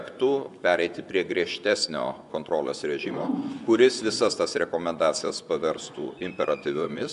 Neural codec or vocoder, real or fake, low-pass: vocoder, 24 kHz, 100 mel bands, Vocos; fake; 10.8 kHz